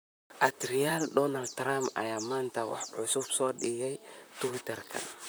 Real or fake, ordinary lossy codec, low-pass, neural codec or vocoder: fake; none; none; vocoder, 44.1 kHz, 128 mel bands, Pupu-Vocoder